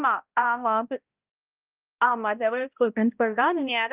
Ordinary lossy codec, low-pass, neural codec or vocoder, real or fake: Opus, 24 kbps; 3.6 kHz; codec, 16 kHz, 0.5 kbps, X-Codec, HuBERT features, trained on balanced general audio; fake